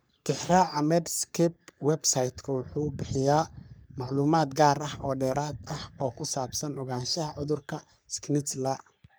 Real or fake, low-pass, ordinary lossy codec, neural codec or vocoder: fake; none; none; codec, 44.1 kHz, 3.4 kbps, Pupu-Codec